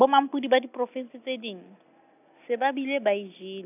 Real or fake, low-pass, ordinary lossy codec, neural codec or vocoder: real; 3.6 kHz; none; none